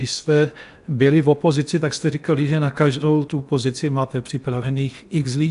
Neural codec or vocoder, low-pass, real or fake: codec, 16 kHz in and 24 kHz out, 0.8 kbps, FocalCodec, streaming, 65536 codes; 10.8 kHz; fake